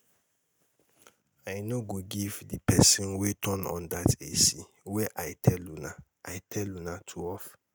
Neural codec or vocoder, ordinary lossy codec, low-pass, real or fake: none; none; none; real